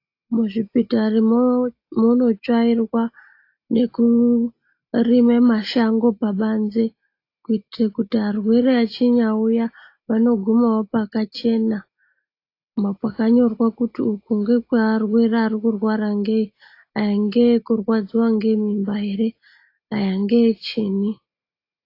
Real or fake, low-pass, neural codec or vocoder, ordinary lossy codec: real; 5.4 kHz; none; AAC, 32 kbps